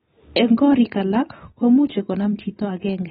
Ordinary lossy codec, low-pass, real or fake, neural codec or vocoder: AAC, 16 kbps; 19.8 kHz; real; none